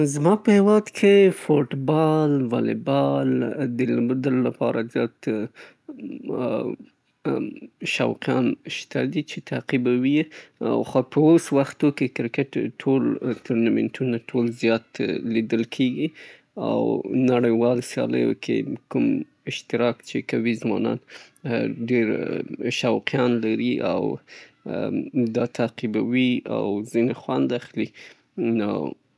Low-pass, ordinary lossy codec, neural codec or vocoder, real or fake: none; none; none; real